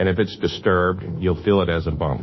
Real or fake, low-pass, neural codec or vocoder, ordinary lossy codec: fake; 7.2 kHz; codec, 24 kHz, 1.2 kbps, DualCodec; MP3, 24 kbps